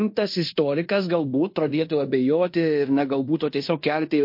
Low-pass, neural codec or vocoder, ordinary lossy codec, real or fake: 5.4 kHz; codec, 16 kHz in and 24 kHz out, 0.9 kbps, LongCat-Audio-Codec, fine tuned four codebook decoder; MP3, 32 kbps; fake